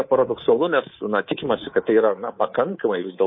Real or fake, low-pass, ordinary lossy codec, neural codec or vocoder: fake; 7.2 kHz; MP3, 24 kbps; codec, 24 kHz, 3.1 kbps, DualCodec